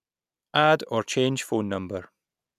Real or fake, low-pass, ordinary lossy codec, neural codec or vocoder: real; 14.4 kHz; none; none